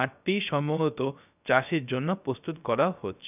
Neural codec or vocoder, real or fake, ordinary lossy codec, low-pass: codec, 16 kHz, 0.3 kbps, FocalCodec; fake; none; 3.6 kHz